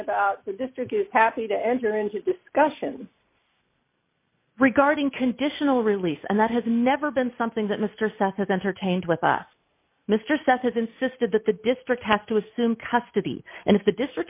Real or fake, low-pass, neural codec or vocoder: real; 3.6 kHz; none